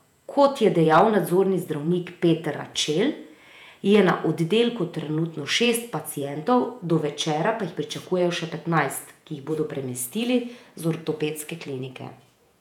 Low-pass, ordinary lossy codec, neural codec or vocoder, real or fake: 19.8 kHz; none; vocoder, 48 kHz, 128 mel bands, Vocos; fake